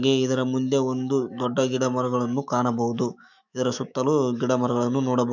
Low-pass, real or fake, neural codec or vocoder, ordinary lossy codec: 7.2 kHz; fake; autoencoder, 48 kHz, 128 numbers a frame, DAC-VAE, trained on Japanese speech; none